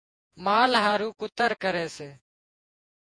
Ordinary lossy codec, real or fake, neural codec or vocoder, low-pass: MP3, 64 kbps; fake; vocoder, 48 kHz, 128 mel bands, Vocos; 9.9 kHz